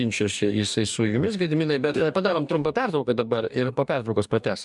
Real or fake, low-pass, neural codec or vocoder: fake; 10.8 kHz; codec, 44.1 kHz, 2.6 kbps, DAC